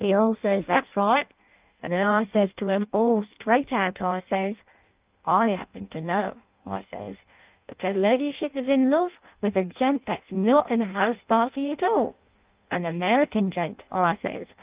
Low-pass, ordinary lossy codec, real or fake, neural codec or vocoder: 3.6 kHz; Opus, 24 kbps; fake; codec, 16 kHz in and 24 kHz out, 0.6 kbps, FireRedTTS-2 codec